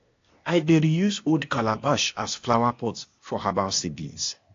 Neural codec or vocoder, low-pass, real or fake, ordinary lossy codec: codec, 16 kHz, 0.8 kbps, ZipCodec; 7.2 kHz; fake; AAC, 48 kbps